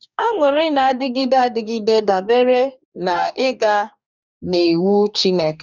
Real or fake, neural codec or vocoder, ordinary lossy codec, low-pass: fake; codec, 44.1 kHz, 2.6 kbps, DAC; none; 7.2 kHz